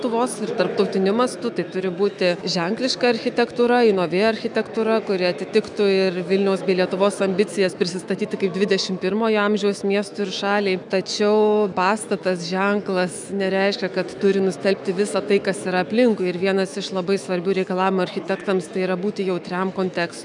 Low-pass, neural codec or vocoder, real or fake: 10.8 kHz; autoencoder, 48 kHz, 128 numbers a frame, DAC-VAE, trained on Japanese speech; fake